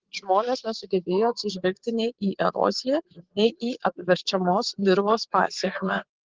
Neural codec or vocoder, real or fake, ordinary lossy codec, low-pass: vocoder, 44.1 kHz, 80 mel bands, Vocos; fake; Opus, 16 kbps; 7.2 kHz